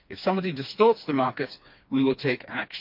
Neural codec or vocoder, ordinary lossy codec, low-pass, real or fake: codec, 16 kHz, 2 kbps, FreqCodec, smaller model; MP3, 48 kbps; 5.4 kHz; fake